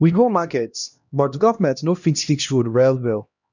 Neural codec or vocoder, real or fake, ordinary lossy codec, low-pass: codec, 16 kHz, 1 kbps, X-Codec, HuBERT features, trained on LibriSpeech; fake; none; 7.2 kHz